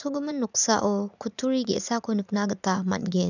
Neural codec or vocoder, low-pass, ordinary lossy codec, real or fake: vocoder, 44.1 kHz, 80 mel bands, Vocos; 7.2 kHz; none; fake